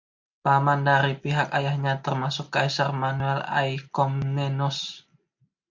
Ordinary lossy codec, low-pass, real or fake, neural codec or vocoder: MP3, 64 kbps; 7.2 kHz; real; none